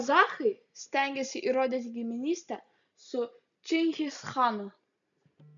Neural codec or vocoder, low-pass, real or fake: none; 7.2 kHz; real